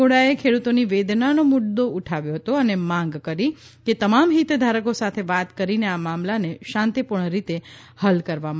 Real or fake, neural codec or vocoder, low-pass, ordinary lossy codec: real; none; none; none